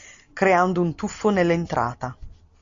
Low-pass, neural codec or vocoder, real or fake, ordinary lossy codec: 7.2 kHz; none; real; MP3, 48 kbps